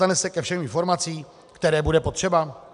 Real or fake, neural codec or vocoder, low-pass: real; none; 10.8 kHz